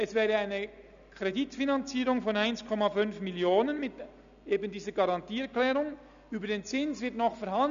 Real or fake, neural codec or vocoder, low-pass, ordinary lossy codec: real; none; 7.2 kHz; none